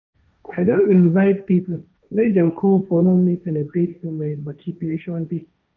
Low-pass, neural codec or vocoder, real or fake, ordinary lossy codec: 7.2 kHz; codec, 16 kHz, 1.1 kbps, Voila-Tokenizer; fake; none